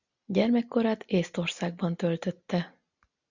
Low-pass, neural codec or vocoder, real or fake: 7.2 kHz; none; real